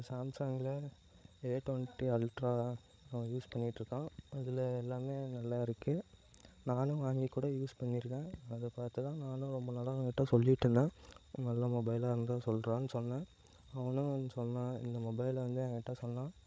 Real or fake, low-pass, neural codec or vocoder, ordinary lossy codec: fake; none; codec, 16 kHz, 16 kbps, FreqCodec, larger model; none